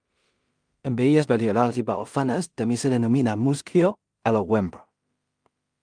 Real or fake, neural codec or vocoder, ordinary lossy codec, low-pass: fake; codec, 16 kHz in and 24 kHz out, 0.4 kbps, LongCat-Audio-Codec, two codebook decoder; Opus, 32 kbps; 9.9 kHz